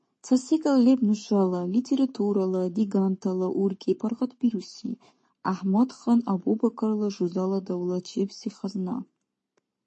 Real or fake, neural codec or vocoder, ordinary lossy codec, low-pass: fake; codec, 44.1 kHz, 7.8 kbps, Pupu-Codec; MP3, 32 kbps; 10.8 kHz